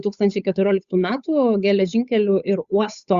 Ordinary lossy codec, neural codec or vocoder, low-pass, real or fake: Opus, 64 kbps; codec, 16 kHz, 8 kbps, FunCodec, trained on Chinese and English, 25 frames a second; 7.2 kHz; fake